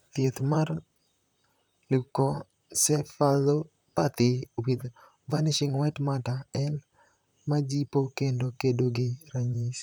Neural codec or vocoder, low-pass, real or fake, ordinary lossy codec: vocoder, 44.1 kHz, 128 mel bands, Pupu-Vocoder; none; fake; none